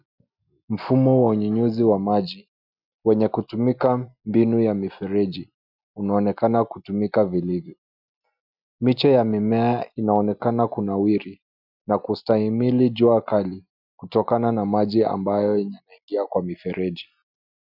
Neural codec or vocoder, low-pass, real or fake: none; 5.4 kHz; real